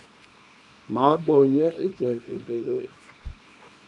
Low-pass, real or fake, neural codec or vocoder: 10.8 kHz; fake; codec, 24 kHz, 0.9 kbps, WavTokenizer, small release